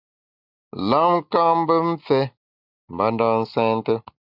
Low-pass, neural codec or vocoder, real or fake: 5.4 kHz; none; real